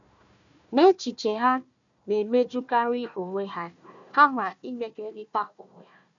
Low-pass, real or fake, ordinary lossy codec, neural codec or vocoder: 7.2 kHz; fake; none; codec, 16 kHz, 1 kbps, FunCodec, trained on Chinese and English, 50 frames a second